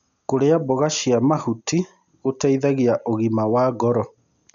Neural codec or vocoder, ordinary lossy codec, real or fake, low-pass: none; none; real; 7.2 kHz